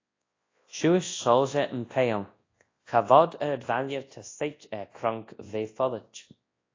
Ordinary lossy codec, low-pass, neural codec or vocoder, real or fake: AAC, 32 kbps; 7.2 kHz; codec, 24 kHz, 0.9 kbps, WavTokenizer, large speech release; fake